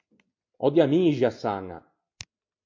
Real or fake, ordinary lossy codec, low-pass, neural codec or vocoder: real; MP3, 48 kbps; 7.2 kHz; none